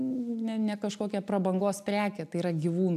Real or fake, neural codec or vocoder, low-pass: real; none; 14.4 kHz